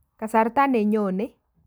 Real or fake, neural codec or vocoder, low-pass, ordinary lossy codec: real; none; none; none